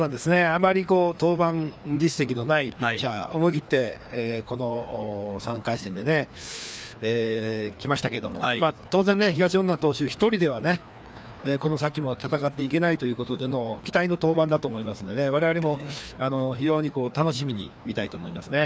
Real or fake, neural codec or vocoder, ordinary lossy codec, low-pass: fake; codec, 16 kHz, 2 kbps, FreqCodec, larger model; none; none